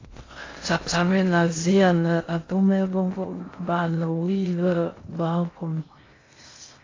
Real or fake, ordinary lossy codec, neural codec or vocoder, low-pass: fake; AAC, 32 kbps; codec, 16 kHz in and 24 kHz out, 0.6 kbps, FocalCodec, streaming, 2048 codes; 7.2 kHz